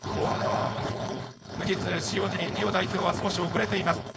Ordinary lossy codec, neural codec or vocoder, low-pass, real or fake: none; codec, 16 kHz, 4.8 kbps, FACodec; none; fake